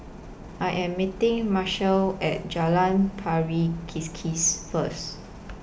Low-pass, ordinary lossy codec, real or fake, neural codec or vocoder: none; none; real; none